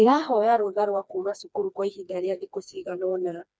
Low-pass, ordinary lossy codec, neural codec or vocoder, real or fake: none; none; codec, 16 kHz, 2 kbps, FreqCodec, smaller model; fake